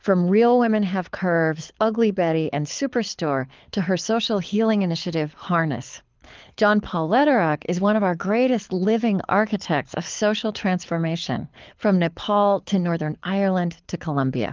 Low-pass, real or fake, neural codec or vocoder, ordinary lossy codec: 7.2 kHz; fake; codec, 24 kHz, 6 kbps, HILCodec; Opus, 24 kbps